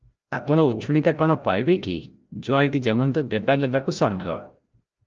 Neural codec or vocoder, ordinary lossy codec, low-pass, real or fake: codec, 16 kHz, 0.5 kbps, FreqCodec, larger model; Opus, 24 kbps; 7.2 kHz; fake